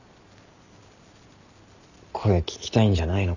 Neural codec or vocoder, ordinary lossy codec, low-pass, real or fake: none; none; 7.2 kHz; real